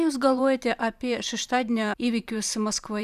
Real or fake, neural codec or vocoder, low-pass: fake; vocoder, 44.1 kHz, 128 mel bands every 512 samples, BigVGAN v2; 14.4 kHz